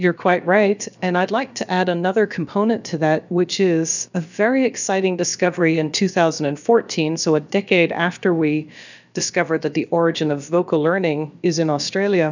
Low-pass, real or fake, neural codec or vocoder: 7.2 kHz; fake; codec, 16 kHz, about 1 kbps, DyCAST, with the encoder's durations